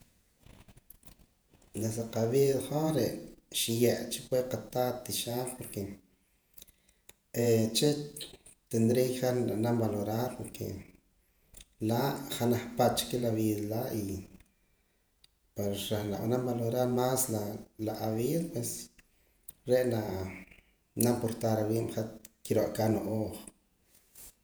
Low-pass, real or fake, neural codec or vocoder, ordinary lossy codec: none; fake; vocoder, 48 kHz, 128 mel bands, Vocos; none